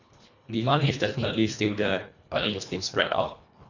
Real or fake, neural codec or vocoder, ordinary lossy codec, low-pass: fake; codec, 24 kHz, 1.5 kbps, HILCodec; none; 7.2 kHz